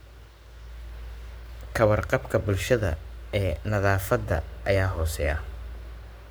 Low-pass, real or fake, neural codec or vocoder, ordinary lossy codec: none; fake; codec, 44.1 kHz, 7.8 kbps, Pupu-Codec; none